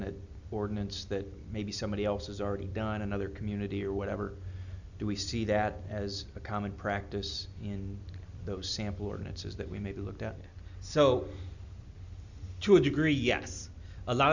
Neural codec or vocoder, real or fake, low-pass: none; real; 7.2 kHz